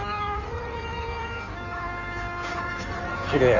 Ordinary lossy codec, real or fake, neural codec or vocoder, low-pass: none; fake; codec, 16 kHz in and 24 kHz out, 1.1 kbps, FireRedTTS-2 codec; 7.2 kHz